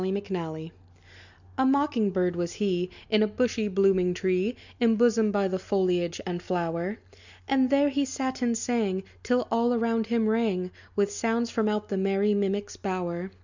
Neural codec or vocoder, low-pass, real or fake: none; 7.2 kHz; real